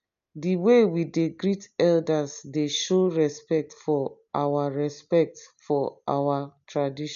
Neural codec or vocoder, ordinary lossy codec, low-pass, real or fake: none; none; 7.2 kHz; real